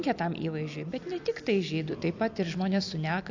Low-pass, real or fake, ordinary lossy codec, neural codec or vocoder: 7.2 kHz; real; AAC, 48 kbps; none